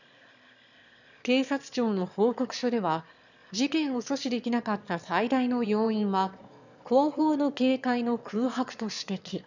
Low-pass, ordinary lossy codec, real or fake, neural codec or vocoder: 7.2 kHz; none; fake; autoencoder, 22.05 kHz, a latent of 192 numbers a frame, VITS, trained on one speaker